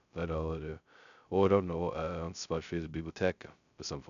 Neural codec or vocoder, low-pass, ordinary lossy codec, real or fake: codec, 16 kHz, 0.2 kbps, FocalCodec; 7.2 kHz; none; fake